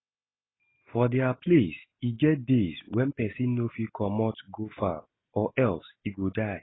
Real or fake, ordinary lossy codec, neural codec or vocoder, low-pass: real; AAC, 16 kbps; none; 7.2 kHz